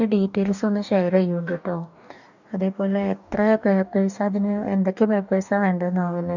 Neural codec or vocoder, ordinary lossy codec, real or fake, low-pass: codec, 44.1 kHz, 2.6 kbps, DAC; none; fake; 7.2 kHz